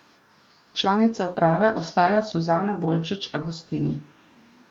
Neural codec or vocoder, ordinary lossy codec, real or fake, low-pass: codec, 44.1 kHz, 2.6 kbps, DAC; none; fake; 19.8 kHz